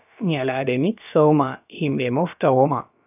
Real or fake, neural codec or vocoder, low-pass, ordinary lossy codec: fake; codec, 16 kHz, about 1 kbps, DyCAST, with the encoder's durations; 3.6 kHz; none